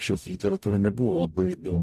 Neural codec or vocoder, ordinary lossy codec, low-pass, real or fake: codec, 44.1 kHz, 0.9 kbps, DAC; AAC, 96 kbps; 14.4 kHz; fake